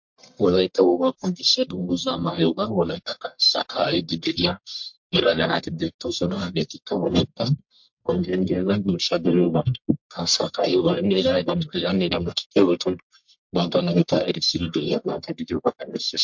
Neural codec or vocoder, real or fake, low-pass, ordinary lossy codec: codec, 44.1 kHz, 1.7 kbps, Pupu-Codec; fake; 7.2 kHz; MP3, 48 kbps